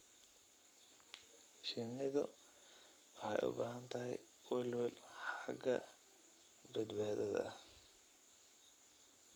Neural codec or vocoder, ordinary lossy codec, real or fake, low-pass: codec, 44.1 kHz, 7.8 kbps, Pupu-Codec; none; fake; none